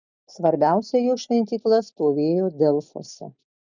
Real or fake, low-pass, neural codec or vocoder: real; 7.2 kHz; none